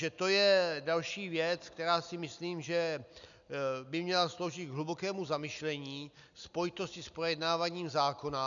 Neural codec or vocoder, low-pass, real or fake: none; 7.2 kHz; real